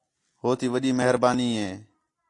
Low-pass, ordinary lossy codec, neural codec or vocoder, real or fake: 10.8 kHz; AAC, 48 kbps; none; real